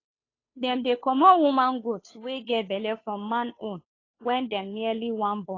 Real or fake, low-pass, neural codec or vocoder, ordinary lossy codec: fake; 7.2 kHz; codec, 16 kHz, 2 kbps, FunCodec, trained on Chinese and English, 25 frames a second; AAC, 32 kbps